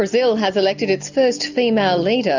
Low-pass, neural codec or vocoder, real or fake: 7.2 kHz; none; real